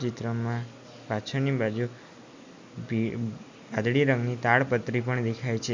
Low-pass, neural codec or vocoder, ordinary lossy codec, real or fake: 7.2 kHz; none; MP3, 64 kbps; real